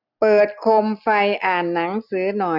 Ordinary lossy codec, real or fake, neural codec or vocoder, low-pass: none; real; none; 5.4 kHz